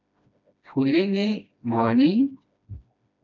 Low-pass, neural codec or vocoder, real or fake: 7.2 kHz; codec, 16 kHz, 1 kbps, FreqCodec, smaller model; fake